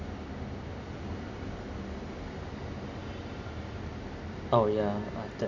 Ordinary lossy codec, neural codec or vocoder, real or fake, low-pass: none; none; real; 7.2 kHz